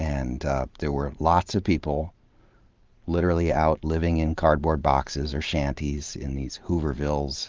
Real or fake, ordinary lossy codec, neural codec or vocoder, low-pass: fake; Opus, 32 kbps; vocoder, 44.1 kHz, 128 mel bands every 512 samples, BigVGAN v2; 7.2 kHz